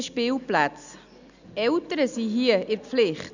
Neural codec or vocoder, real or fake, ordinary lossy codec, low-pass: none; real; none; 7.2 kHz